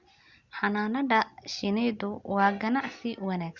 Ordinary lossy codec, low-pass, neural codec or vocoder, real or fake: Opus, 64 kbps; 7.2 kHz; none; real